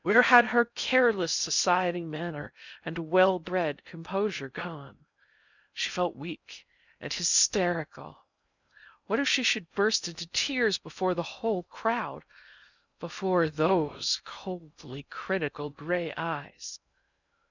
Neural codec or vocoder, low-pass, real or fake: codec, 16 kHz in and 24 kHz out, 0.6 kbps, FocalCodec, streaming, 4096 codes; 7.2 kHz; fake